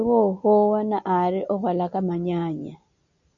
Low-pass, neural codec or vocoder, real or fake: 7.2 kHz; none; real